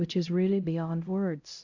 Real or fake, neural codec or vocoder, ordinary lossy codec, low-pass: fake; codec, 16 kHz, 0.5 kbps, X-Codec, HuBERT features, trained on LibriSpeech; AAC, 48 kbps; 7.2 kHz